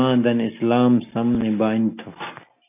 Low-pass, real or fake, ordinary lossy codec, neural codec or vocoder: 3.6 kHz; real; AAC, 32 kbps; none